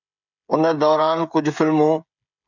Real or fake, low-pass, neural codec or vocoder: fake; 7.2 kHz; codec, 16 kHz, 16 kbps, FreqCodec, smaller model